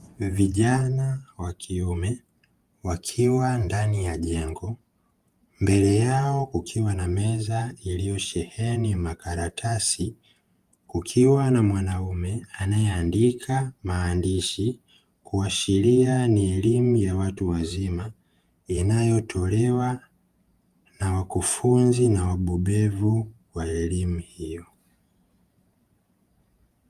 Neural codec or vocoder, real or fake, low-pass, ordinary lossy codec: vocoder, 48 kHz, 128 mel bands, Vocos; fake; 14.4 kHz; Opus, 32 kbps